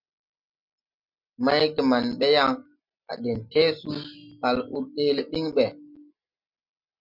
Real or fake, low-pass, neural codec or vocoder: real; 5.4 kHz; none